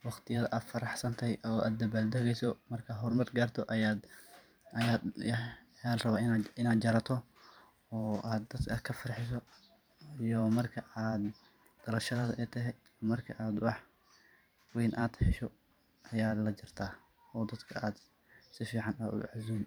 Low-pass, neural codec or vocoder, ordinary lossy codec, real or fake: none; vocoder, 44.1 kHz, 128 mel bands every 512 samples, BigVGAN v2; none; fake